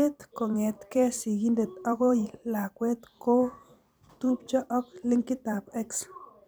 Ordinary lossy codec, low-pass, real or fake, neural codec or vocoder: none; none; fake; vocoder, 44.1 kHz, 128 mel bands every 256 samples, BigVGAN v2